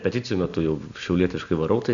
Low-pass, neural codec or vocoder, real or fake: 7.2 kHz; none; real